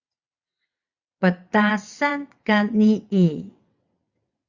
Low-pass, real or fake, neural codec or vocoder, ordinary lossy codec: 7.2 kHz; fake; vocoder, 22.05 kHz, 80 mel bands, WaveNeXt; Opus, 64 kbps